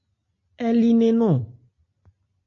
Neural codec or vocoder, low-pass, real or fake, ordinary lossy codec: none; 7.2 kHz; real; AAC, 48 kbps